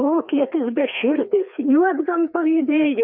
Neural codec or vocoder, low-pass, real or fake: codec, 16 kHz, 2 kbps, FreqCodec, larger model; 5.4 kHz; fake